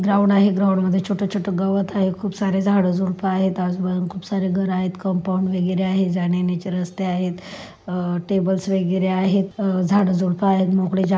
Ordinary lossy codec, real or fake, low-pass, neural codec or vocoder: none; real; none; none